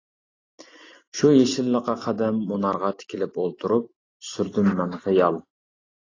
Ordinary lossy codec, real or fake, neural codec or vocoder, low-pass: AAC, 32 kbps; real; none; 7.2 kHz